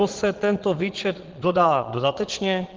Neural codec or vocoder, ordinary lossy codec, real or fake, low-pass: vocoder, 22.05 kHz, 80 mel bands, Vocos; Opus, 16 kbps; fake; 7.2 kHz